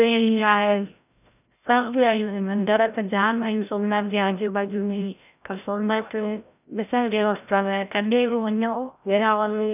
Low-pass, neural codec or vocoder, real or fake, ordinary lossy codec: 3.6 kHz; codec, 16 kHz, 0.5 kbps, FreqCodec, larger model; fake; none